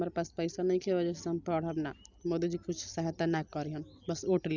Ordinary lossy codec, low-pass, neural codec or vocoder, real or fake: none; 7.2 kHz; none; real